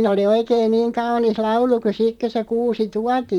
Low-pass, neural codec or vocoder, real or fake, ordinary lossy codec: 19.8 kHz; vocoder, 44.1 kHz, 128 mel bands, Pupu-Vocoder; fake; none